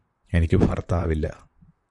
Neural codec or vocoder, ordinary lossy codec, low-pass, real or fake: vocoder, 44.1 kHz, 128 mel bands, Pupu-Vocoder; MP3, 96 kbps; 10.8 kHz; fake